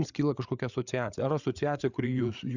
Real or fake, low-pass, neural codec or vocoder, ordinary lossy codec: fake; 7.2 kHz; codec, 16 kHz, 8 kbps, FreqCodec, larger model; Opus, 64 kbps